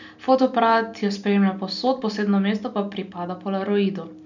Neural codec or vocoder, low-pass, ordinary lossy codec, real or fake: none; 7.2 kHz; none; real